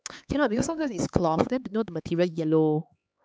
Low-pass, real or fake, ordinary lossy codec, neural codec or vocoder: none; fake; none; codec, 16 kHz, 4 kbps, X-Codec, HuBERT features, trained on LibriSpeech